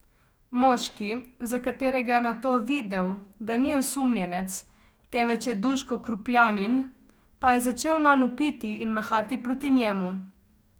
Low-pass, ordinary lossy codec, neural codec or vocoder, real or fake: none; none; codec, 44.1 kHz, 2.6 kbps, DAC; fake